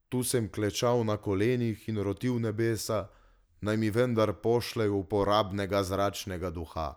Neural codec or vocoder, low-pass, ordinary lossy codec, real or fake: none; none; none; real